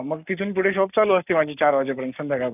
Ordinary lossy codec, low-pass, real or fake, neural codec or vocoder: none; 3.6 kHz; fake; codec, 44.1 kHz, 7.8 kbps, Pupu-Codec